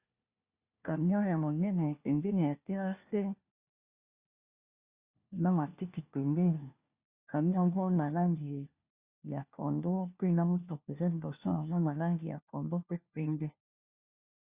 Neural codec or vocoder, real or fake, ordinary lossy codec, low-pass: codec, 16 kHz, 1 kbps, FunCodec, trained on LibriTTS, 50 frames a second; fake; Opus, 64 kbps; 3.6 kHz